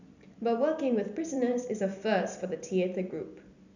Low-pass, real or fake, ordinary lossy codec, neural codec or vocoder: 7.2 kHz; fake; none; vocoder, 44.1 kHz, 128 mel bands every 256 samples, BigVGAN v2